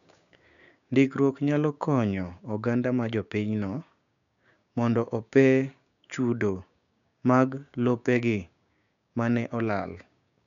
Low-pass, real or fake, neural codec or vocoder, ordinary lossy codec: 7.2 kHz; fake; codec, 16 kHz, 6 kbps, DAC; none